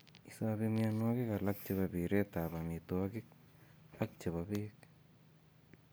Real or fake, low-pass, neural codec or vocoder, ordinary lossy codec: real; none; none; none